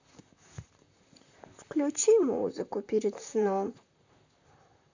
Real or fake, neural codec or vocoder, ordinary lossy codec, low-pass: fake; vocoder, 44.1 kHz, 128 mel bands, Pupu-Vocoder; AAC, 48 kbps; 7.2 kHz